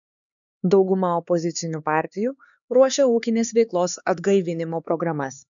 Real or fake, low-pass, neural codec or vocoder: fake; 7.2 kHz; codec, 16 kHz, 4 kbps, X-Codec, HuBERT features, trained on LibriSpeech